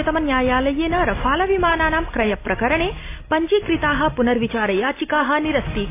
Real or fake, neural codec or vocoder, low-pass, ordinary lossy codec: real; none; 3.6 kHz; AAC, 24 kbps